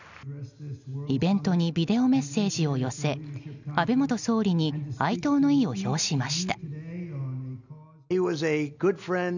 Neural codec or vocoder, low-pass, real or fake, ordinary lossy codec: none; 7.2 kHz; real; none